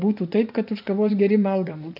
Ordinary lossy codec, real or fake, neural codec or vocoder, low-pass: AAC, 48 kbps; fake; vocoder, 24 kHz, 100 mel bands, Vocos; 5.4 kHz